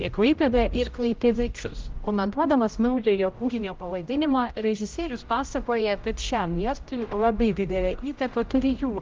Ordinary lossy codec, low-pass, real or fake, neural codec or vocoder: Opus, 24 kbps; 7.2 kHz; fake; codec, 16 kHz, 0.5 kbps, X-Codec, HuBERT features, trained on general audio